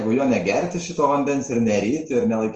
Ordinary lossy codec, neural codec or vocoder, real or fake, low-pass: Opus, 24 kbps; none; real; 7.2 kHz